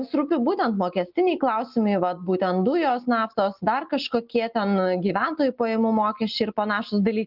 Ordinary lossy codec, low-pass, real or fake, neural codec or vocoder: Opus, 24 kbps; 5.4 kHz; real; none